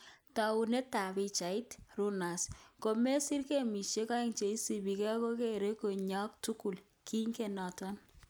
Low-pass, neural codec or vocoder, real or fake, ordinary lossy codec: none; none; real; none